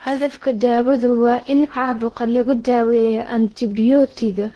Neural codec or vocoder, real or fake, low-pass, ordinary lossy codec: codec, 16 kHz in and 24 kHz out, 0.8 kbps, FocalCodec, streaming, 65536 codes; fake; 10.8 kHz; Opus, 16 kbps